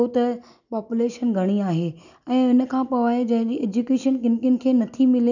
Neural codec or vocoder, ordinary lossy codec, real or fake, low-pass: none; none; real; 7.2 kHz